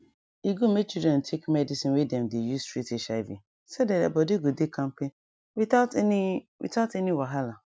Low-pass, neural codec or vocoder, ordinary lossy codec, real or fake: none; none; none; real